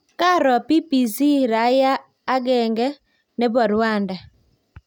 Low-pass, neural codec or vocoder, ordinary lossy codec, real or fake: 19.8 kHz; none; none; real